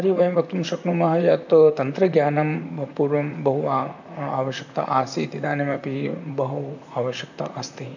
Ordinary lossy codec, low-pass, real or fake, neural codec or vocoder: none; 7.2 kHz; fake; vocoder, 44.1 kHz, 128 mel bands, Pupu-Vocoder